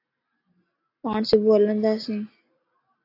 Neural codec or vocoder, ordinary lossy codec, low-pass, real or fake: none; AAC, 32 kbps; 5.4 kHz; real